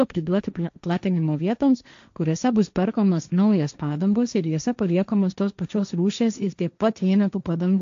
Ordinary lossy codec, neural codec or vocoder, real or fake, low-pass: AAC, 64 kbps; codec, 16 kHz, 1.1 kbps, Voila-Tokenizer; fake; 7.2 kHz